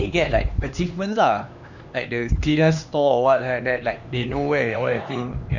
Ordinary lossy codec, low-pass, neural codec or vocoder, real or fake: none; 7.2 kHz; codec, 16 kHz, 2 kbps, X-Codec, HuBERT features, trained on LibriSpeech; fake